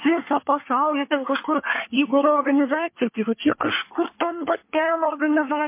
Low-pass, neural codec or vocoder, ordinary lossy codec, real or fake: 3.6 kHz; codec, 24 kHz, 1 kbps, SNAC; MP3, 24 kbps; fake